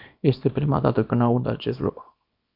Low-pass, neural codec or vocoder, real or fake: 5.4 kHz; codec, 24 kHz, 0.9 kbps, WavTokenizer, small release; fake